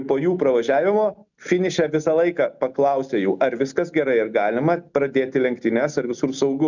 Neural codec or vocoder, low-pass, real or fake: none; 7.2 kHz; real